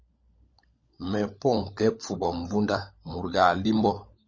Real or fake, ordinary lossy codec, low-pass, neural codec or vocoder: fake; MP3, 32 kbps; 7.2 kHz; codec, 16 kHz, 16 kbps, FunCodec, trained on LibriTTS, 50 frames a second